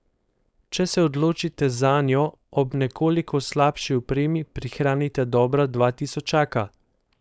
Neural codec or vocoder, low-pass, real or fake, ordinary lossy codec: codec, 16 kHz, 4.8 kbps, FACodec; none; fake; none